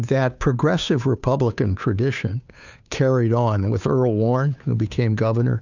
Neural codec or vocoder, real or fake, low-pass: codec, 16 kHz, 2 kbps, FunCodec, trained on Chinese and English, 25 frames a second; fake; 7.2 kHz